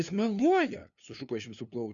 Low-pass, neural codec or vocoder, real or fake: 7.2 kHz; codec, 16 kHz, 2 kbps, FunCodec, trained on LibriTTS, 25 frames a second; fake